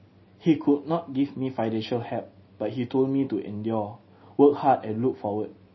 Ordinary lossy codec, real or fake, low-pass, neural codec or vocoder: MP3, 24 kbps; real; 7.2 kHz; none